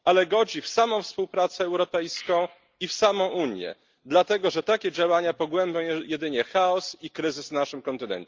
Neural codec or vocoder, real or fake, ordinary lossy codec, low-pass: none; real; Opus, 32 kbps; 7.2 kHz